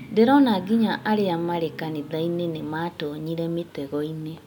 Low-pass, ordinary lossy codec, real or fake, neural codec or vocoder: 19.8 kHz; none; real; none